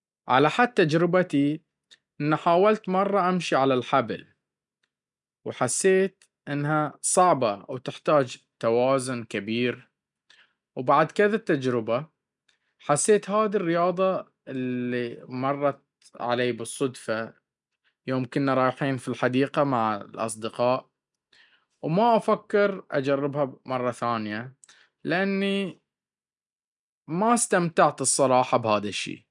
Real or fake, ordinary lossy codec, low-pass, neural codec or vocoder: real; none; 10.8 kHz; none